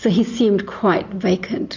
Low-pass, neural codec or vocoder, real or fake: 7.2 kHz; none; real